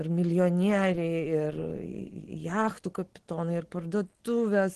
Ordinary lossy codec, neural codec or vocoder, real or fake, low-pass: Opus, 16 kbps; none; real; 10.8 kHz